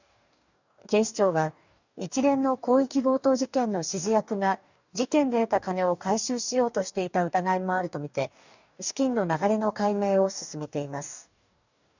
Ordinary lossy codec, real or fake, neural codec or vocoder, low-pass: none; fake; codec, 44.1 kHz, 2.6 kbps, DAC; 7.2 kHz